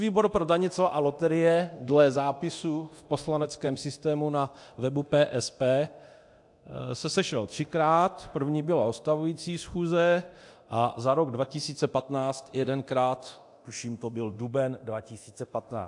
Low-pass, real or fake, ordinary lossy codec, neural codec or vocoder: 10.8 kHz; fake; AAC, 64 kbps; codec, 24 kHz, 0.9 kbps, DualCodec